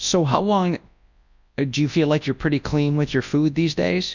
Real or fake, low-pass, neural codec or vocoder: fake; 7.2 kHz; codec, 24 kHz, 0.9 kbps, WavTokenizer, large speech release